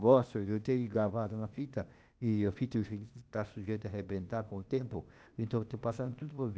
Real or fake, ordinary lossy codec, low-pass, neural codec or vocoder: fake; none; none; codec, 16 kHz, 0.8 kbps, ZipCodec